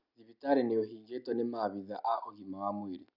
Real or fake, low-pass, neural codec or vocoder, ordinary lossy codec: real; 5.4 kHz; none; none